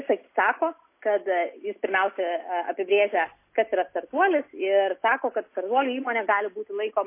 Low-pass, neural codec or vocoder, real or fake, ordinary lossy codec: 3.6 kHz; none; real; MP3, 24 kbps